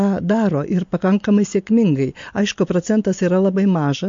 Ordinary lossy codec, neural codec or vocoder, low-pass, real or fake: MP3, 48 kbps; none; 7.2 kHz; real